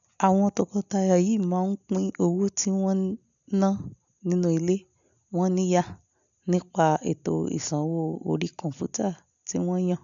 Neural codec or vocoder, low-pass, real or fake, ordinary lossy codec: none; 7.2 kHz; real; none